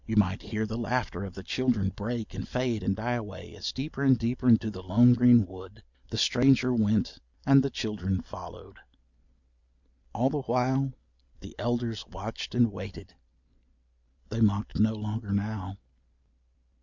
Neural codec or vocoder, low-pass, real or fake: none; 7.2 kHz; real